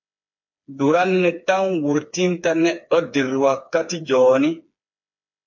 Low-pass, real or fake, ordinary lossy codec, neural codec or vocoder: 7.2 kHz; fake; MP3, 48 kbps; codec, 16 kHz, 4 kbps, FreqCodec, smaller model